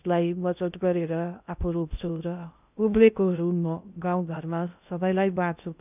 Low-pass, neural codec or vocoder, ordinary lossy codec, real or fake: 3.6 kHz; codec, 16 kHz in and 24 kHz out, 0.6 kbps, FocalCodec, streaming, 2048 codes; none; fake